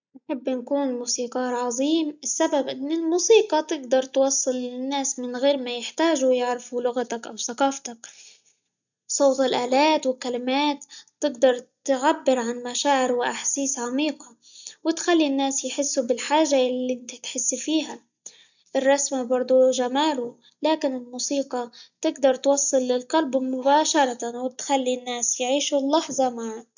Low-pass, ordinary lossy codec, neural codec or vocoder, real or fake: 7.2 kHz; none; none; real